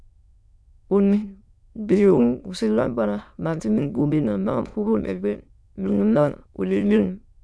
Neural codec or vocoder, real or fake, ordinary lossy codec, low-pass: autoencoder, 22.05 kHz, a latent of 192 numbers a frame, VITS, trained on many speakers; fake; none; none